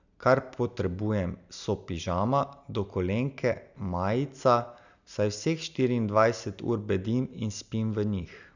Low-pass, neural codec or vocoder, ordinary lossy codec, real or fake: 7.2 kHz; none; none; real